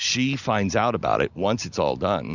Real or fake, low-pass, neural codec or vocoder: real; 7.2 kHz; none